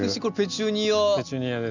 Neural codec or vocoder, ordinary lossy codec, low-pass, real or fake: none; none; 7.2 kHz; real